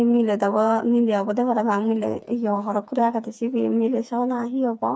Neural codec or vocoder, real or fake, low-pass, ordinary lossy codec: codec, 16 kHz, 4 kbps, FreqCodec, smaller model; fake; none; none